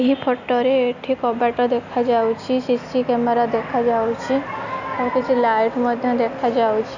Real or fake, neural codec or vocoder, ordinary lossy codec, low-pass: real; none; none; 7.2 kHz